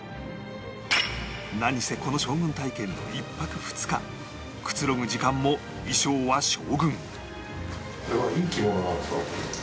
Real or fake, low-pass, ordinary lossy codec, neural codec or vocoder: real; none; none; none